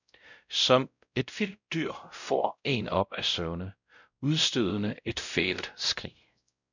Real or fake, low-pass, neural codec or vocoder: fake; 7.2 kHz; codec, 16 kHz, 0.5 kbps, X-Codec, WavLM features, trained on Multilingual LibriSpeech